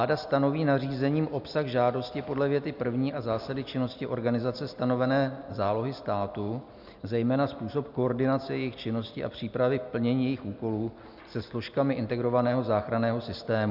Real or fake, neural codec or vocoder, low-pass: real; none; 5.4 kHz